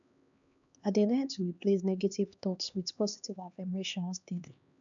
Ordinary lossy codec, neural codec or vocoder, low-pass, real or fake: MP3, 96 kbps; codec, 16 kHz, 2 kbps, X-Codec, HuBERT features, trained on LibriSpeech; 7.2 kHz; fake